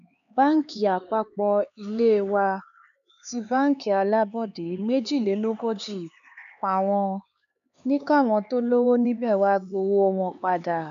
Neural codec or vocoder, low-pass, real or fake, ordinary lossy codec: codec, 16 kHz, 4 kbps, X-Codec, HuBERT features, trained on LibriSpeech; 7.2 kHz; fake; none